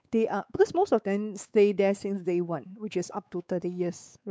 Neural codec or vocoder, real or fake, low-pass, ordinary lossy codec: codec, 16 kHz, 4 kbps, X-Codec, WavLM features, trained on Multilingual LibriSpeech; fake; none; none